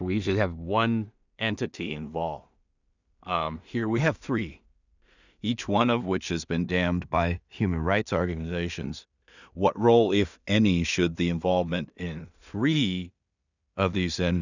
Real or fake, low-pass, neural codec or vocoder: fake; 7.2 kHz; codec, 16 kHz in and 24 kHz out, 0.4 kbps, LongCat-Audio-Codec, two codebook decoder